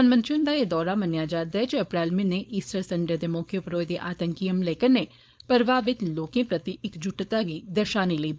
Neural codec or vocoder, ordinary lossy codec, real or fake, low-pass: codec, 16 kHz, 4.8 kbps, FACodec; none; fake; none